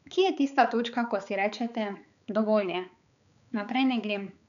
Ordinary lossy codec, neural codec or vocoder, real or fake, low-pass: none; codec, 16 kHz, 4 kbps, X-Codec, HuBERT features, trained on balanced general audio; fake; 7.2 kHz